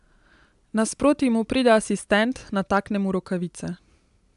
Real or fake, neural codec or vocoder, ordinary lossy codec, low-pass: real; none; AAC, 96 kbps; 10.8 kHz